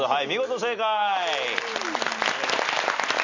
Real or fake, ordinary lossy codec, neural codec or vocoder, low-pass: real; MP3, 64 kbps; none; 7.2 kHz